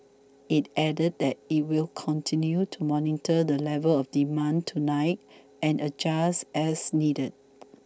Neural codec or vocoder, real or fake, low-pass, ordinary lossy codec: none; real; none; none